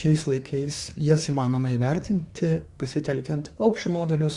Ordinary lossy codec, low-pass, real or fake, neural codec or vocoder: Opus, 64 kbps; 10.8 kHz; fake; codec, 24 kHz, 1 kbps, SNAC